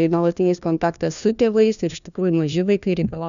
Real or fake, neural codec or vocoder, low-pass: fake; codec, 16 kHz, 1 kbps, FunCodec, trained on LibriTTS, 50 frames a second; 7.2 kHz